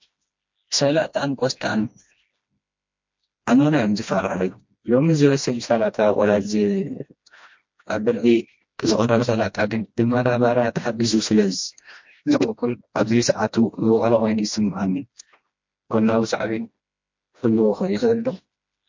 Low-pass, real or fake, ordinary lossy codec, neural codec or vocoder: 7.2 kHz; fake; MP3, 48 kbps; codec, 16 kHz, 1 kbps, FreqCodec, smaller model